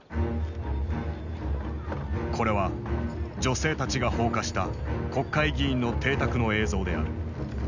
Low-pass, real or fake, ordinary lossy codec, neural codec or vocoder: 7.2 kHz; real; none; none